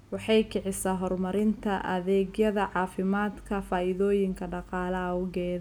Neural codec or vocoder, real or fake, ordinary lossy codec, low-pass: none; real; none; 19.8 kHz